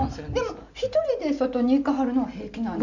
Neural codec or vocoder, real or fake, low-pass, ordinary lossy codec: none; real; 7.2 kHz; none